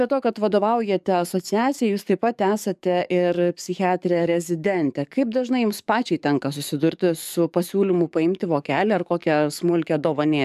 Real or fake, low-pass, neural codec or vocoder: fake; 14.4 kHz; codec, 44.1 kHz, 7.8 kbps, DAC